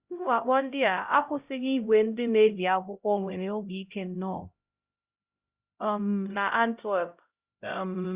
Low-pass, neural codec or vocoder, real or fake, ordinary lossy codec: 3.6 kHz; codec, 16 kHz, 0.5 kbps, X-Codec, HuBERT features, trained on LibriSpeech; fake; Opus, 64 kbps